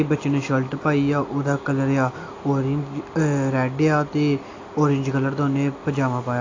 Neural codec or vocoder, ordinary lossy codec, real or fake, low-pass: none; AAC, 32 kbps; real; 7.2 kHz